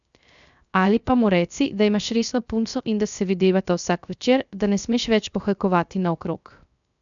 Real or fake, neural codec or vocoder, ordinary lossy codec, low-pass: fake; codec, 16 kHz, 0.3 kbps, FocalCodec; none; 7.2 kHz